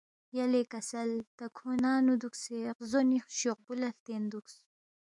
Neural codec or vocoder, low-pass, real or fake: autoencoder, 48 kHz, 128 numbers a frame, DAC-VAE, trained on Japanese speech; 10.8 kHz; fake